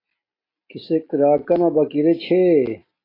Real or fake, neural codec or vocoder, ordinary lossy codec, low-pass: real; none; AAC, 24 kbps; 5.4 kHz